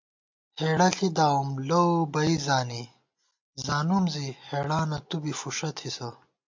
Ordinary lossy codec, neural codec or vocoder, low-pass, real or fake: MP3, 48 kbps; none; 7.2 kHz; real